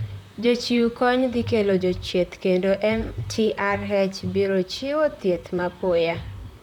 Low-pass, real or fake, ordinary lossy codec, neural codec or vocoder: 19.8 kHz; fake; none; vocoder, 44.1 kHz, 128 mel bands, Pupu-Vocoder